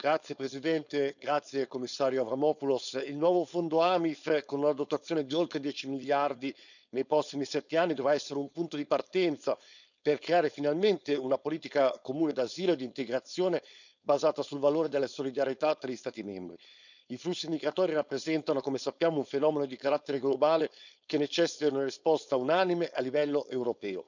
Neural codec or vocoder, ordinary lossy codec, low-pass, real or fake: codec, 16 kHz, 4.8 kbps, FACodec; none; 7.2 kHz; fake